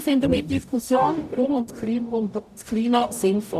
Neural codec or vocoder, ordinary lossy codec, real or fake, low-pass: codec, 44.1 kHz, 0.9 kbps, DAC; none; fake; 14.4 kHz